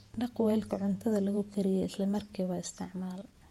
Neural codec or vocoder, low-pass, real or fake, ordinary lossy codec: vocoder, 48 kHz, 128 mel bands, Vocos; 19.8 kHz; fake; MP3, 64 kbps